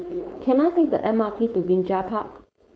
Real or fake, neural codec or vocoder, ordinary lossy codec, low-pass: fake; codec, 16 kHz, 4.8 kbps, FACodec; none; none